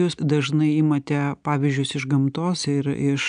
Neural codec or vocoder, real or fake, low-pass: none; real; 9.9 kHz